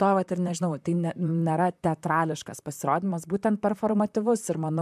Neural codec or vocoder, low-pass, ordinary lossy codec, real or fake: vocoder, 44.1 kHz, 128 mel bands, Pupu-Vocoder; 14.4 kHz; MP3, 96 kbps; fake